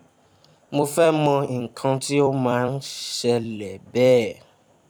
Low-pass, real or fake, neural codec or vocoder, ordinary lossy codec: 19.8 kHz; fake; vocoder, 44.1 kHz, 128 mel bands every 256 samples, BigVGAN v2; none